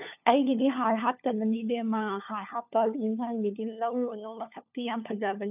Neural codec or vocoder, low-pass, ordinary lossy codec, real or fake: codec, 16 kHz, 4 kbps, FunCodec, trained on LibriTTS, 50 frames a second; 3.6 kHz; none; fake